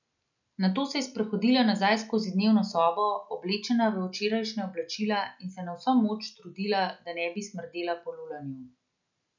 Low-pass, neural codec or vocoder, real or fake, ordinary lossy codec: 7.2 kHz; none; real; none